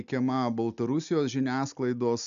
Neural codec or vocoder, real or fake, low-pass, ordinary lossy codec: none; real; 7.2 kHz; MP3, 96 kbps